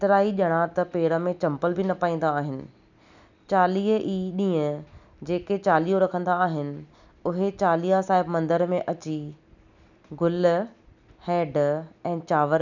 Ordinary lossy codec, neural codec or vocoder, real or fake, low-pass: none; none; real; 7.2 kHz